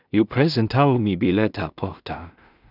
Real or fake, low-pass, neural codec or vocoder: fake; 5.4 kHz; codec, 16 kHz in and 24 kHz out, 0.4 kbps, LongCat-Audio-Codec, two codebook decoder